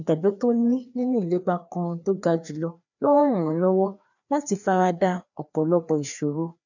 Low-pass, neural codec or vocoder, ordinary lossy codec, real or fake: 7.2 kHz; codec, 16 kHz, 2 kbps, FreqCodec, larger model; none; fake